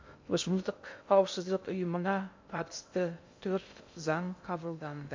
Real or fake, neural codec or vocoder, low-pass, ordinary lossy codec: fake; codec, 16 kHz in and 24 kHz out, 0.6 kbps, FocalCodec, streaming, 2048 codes; 7.2 kHz; MP3, 48 kbps